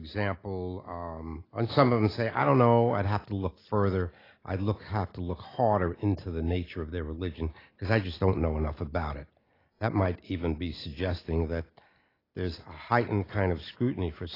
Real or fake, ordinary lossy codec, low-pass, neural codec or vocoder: real; AAC, 24 kbps; 5.4 kHz; none